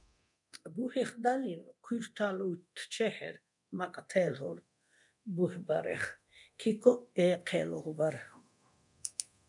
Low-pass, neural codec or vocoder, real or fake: 10.8 kHz; codec, 24 kHz, 0.9 kbps, DualCodec; fake